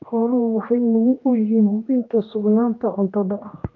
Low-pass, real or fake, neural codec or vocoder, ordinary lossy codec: 7.2 kHz; fake; codec, 16 kHz, 1 kbps, X-Codec, HuBERT features, trained on general audio; Opus, 24 kbps